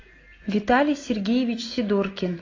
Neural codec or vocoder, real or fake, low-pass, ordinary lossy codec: none; real; 7.2 kHz; AAC, 32 kbps